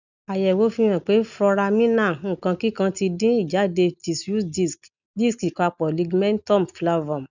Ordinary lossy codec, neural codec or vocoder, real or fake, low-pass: none; none; real; 7.2 kHz